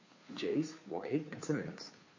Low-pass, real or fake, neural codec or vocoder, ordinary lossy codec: 7.2 kHz; fake; codec, 16 kHz, 2 kbps, FunCodec, trained on Chinese and English, 25 frames a second; MP3, 32 kbps